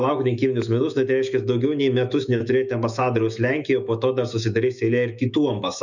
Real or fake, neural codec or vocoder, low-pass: fake; autoencoder, 48 kHz, 128 numbers a frame, DAC-VAE, trained on Japanese speech; 7.2 kHz